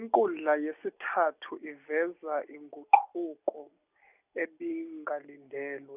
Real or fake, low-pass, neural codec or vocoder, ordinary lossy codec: real; 3.6 kHz; none; none